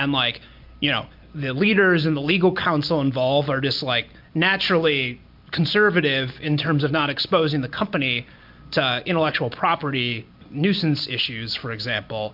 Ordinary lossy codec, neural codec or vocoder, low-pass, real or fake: MP3, 48 kbps; none; 5.4 kHz; real